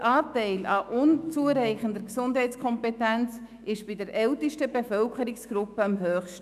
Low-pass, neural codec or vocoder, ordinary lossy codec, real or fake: 14.4 kHz; autoencoder, 48 kHz, 128 numbers a frame, DAC-VAE, trained on Japanese speech; none; fake